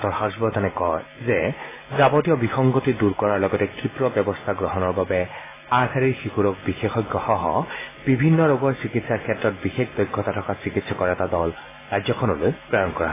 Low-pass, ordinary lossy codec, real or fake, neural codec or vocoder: 3.6 kHz; AAC, 16 kbps; real; none